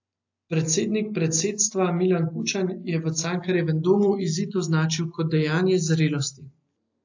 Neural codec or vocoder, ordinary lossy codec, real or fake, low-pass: none; AAC, 48 kbps; real; 7.2 kHz